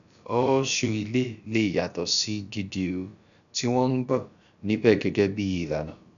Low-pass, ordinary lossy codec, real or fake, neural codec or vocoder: 7.2 kHz; none; fake; codec, 16 kHz, about 1 kbps, DyCAST, with the encoder's durations